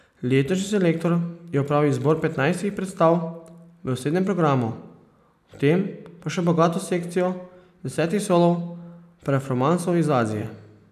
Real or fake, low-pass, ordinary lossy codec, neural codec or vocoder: real; 14.4 kHz; none; none